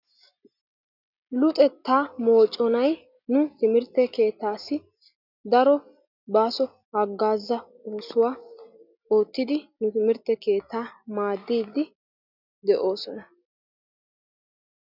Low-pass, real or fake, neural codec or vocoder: 5.4 kHz; real; none